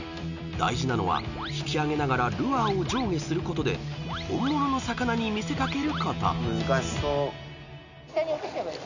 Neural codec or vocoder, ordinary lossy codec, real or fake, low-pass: none; none; real; 7.2 kHz